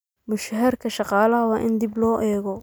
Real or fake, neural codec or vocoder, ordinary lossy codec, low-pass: real; none; none; none